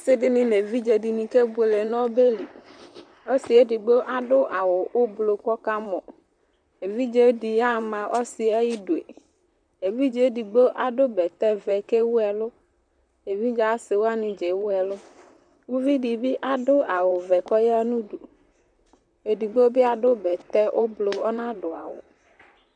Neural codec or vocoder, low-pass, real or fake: vocoder, 44.1 kHz, 128 mel bands, Pupu-Vocoder; 9.9 kHz; fake